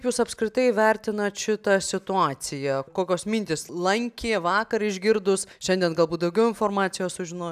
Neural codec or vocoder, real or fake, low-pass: none; real; 14.4 kHz